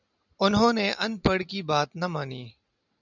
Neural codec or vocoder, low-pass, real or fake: none; 7.2 kHz; real